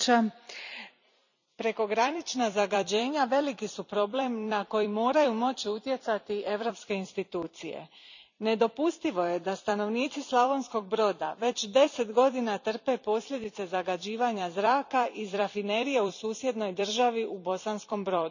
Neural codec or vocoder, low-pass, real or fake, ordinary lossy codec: vocoder, 44.1 kHz, 128 mel bands every 256 samples, BigVGAN v2; 7.2 kHz; fake; none